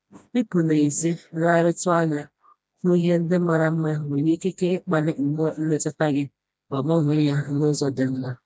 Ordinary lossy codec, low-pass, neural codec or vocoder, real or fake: none; none; codec, 16 kHz, 1 kbps, FreqCodec, smaller model; fake